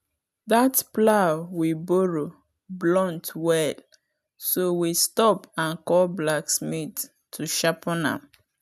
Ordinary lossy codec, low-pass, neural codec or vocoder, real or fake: none; 14.4 kHz; none; real